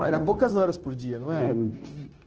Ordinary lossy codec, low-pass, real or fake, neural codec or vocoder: Opus, 16 kbps; 7.2 kHz; fake; codec, 16 kHz, 0.9 kbps, LongCat-Audio-Codec